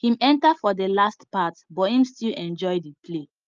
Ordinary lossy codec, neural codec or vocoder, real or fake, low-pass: Opus, 24 kbps; none; real; 7.2 kHz